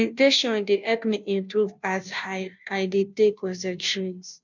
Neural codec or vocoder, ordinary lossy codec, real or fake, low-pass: codec, 16 kHz, 0.5 kbps, FunCodec, trained on Chinese and English, 25 frames a second; none; fake; 7.2 kHz